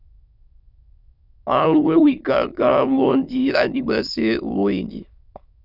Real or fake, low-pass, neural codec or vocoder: fake; 5.4 kHz; autoencoder, 22.05 kHz, a latent of 192 numbers a frame, VITS, trained on many speakers